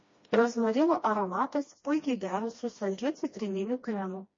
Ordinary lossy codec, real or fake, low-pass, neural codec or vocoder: MP3, 32 kbps; fake; 7.2 kHz; codec, 16 kHz, 1 kbps, FreqCodec, smaller model